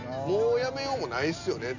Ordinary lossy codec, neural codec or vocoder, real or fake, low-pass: none; none; real; 7.2 kHz